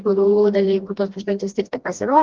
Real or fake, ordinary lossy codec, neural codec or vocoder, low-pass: fake; Opus, 16 kbps; codec, 16 kHz, 1 kbps, FreqCodec, smaller model; 7.2 kHz